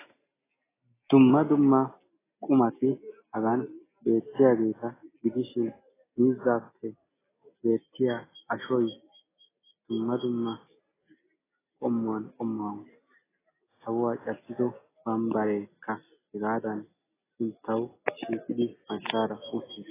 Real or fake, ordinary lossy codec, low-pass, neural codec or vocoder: real; AAC, 16 kbps; 3.6 kHz; none